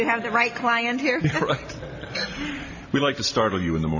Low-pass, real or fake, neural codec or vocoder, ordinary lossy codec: 7.2 kHz; real; none; AAC, 48 kbps